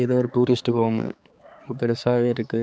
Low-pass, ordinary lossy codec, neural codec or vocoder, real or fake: none; none; codec, 16 kHz, 4 kbps, X-Codec, HuBERT features, trained on balanced general audio; fake